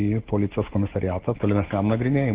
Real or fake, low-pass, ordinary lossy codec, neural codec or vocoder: real; 3.6 kHz; Opus, 16 kbps; none